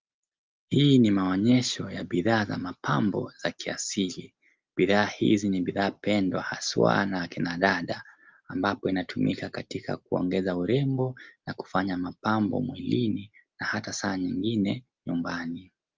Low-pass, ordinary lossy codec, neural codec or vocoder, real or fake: 7.2 kHz; Opus, 32 kbps; none; real